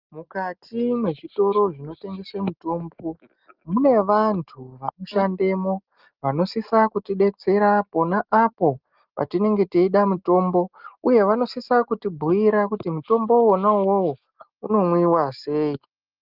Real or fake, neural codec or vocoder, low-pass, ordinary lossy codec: real; none; 5.4 kHz; Opus, 24 kbps